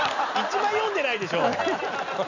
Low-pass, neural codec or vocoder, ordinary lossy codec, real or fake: 7.2 kHz; none; none; real